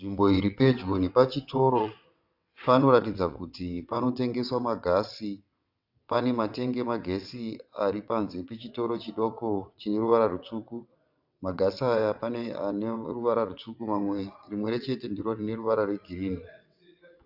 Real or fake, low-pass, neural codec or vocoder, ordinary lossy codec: fake; 5.4 kHz; vocoder, 22.05 kHz, 80 mel bands, WaveNeXt; AAC, 48 kbps